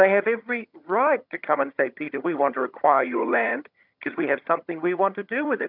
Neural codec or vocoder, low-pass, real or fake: vocoder, 22.05 kHz, 80 mel bands, HiFi-GAN; 5.4 kHz; fake